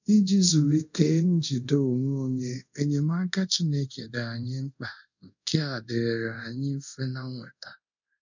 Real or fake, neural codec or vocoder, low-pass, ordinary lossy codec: fake; codec, 24 kHz, 0.5 kbps, DualCodec; 7.2 kHz; none